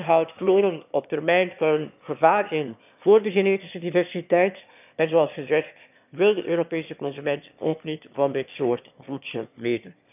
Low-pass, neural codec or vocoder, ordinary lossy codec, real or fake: 3.6 kHz; autoencoder, 22.05 kHz, a latent of 192 numbers a frame, VITS, trained on one speaker; none; fake